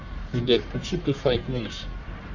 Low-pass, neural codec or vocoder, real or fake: 7.2 kHz; codec, 44.1 kHz, 1.7 kbps, Pupu-Codec; fake